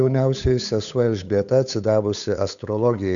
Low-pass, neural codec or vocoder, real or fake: 7.2 kHz; codec, 16 kHz, 8 kbps, FunCodec, trained on Chinese and English, 25 frames a second; fake